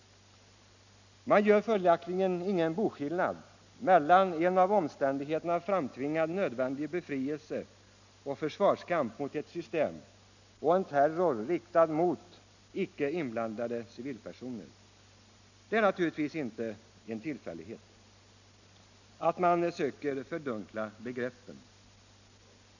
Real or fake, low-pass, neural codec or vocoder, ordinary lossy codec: real; 7.2 kHz; none; none